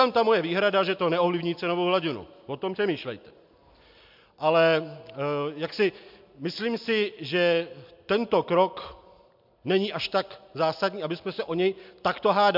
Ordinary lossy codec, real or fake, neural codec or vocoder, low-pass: MP3, 48 kbps; real; none; 5.4 kHz